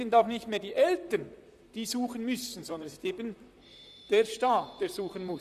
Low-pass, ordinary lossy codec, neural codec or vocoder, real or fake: 14.4 kHz; none; vocoder, 44.1 kHz, 128 mel bands, Pupu-Vocoder; fake